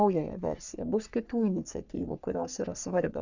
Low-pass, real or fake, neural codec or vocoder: 7.2 kHz; fake; codec, 44.1 kHz, 3.4 kbps, Pupu-Codec